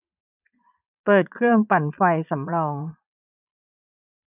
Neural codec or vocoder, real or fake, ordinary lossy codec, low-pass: vocoder, 22.05 kHz, 80 mel bands, Vocos; fake; none; 3.6 kHz